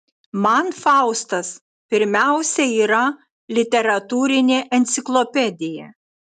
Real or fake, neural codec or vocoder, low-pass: real; none; 10.8 kHz